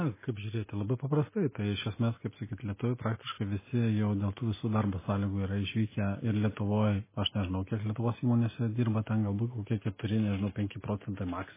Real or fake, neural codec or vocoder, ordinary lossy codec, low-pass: real; none; MP3, 16 kbps; 3.6 kHz